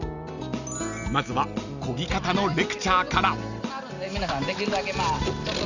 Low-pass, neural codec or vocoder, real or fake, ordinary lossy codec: 7.2 kHz; none; real; none